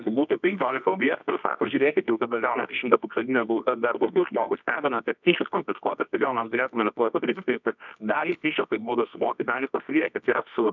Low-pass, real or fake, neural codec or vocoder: 7.2 kHz; fake; codec, 24 kHz, 0.9 kbps, WavTokenizer, medium music audio release